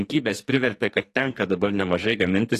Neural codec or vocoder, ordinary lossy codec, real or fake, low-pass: codec, 44.1 kHz, 2.6 kbps, SNAC; AAC, 48 kbps; fake; 14.4 kHz